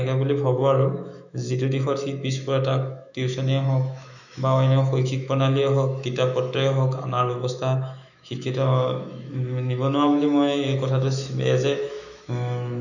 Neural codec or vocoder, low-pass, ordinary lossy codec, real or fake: autoencoder, 48 kHz, 128 numbers a frame, DAC-VAE, trained on Japanese speech; 7.2 kHz; none; fake